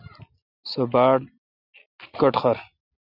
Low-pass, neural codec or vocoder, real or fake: 5.4 kHz; none; real